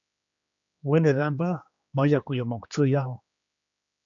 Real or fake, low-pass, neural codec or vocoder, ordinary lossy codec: fake; 7.2 kHz; codec, 16 kHz, 2 kbps, X-Codec, HuBERT features, trained on general audio; AAC, 64 kbps